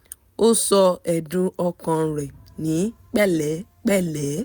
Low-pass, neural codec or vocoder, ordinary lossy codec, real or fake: none; none; none; real